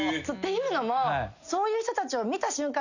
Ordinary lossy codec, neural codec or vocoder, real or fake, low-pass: none; none; real; 7.2 kHz